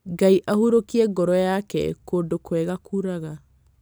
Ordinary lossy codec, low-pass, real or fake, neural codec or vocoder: none; none; real; none